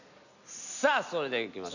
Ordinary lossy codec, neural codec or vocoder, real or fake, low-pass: none; none; real; 7.2 kHz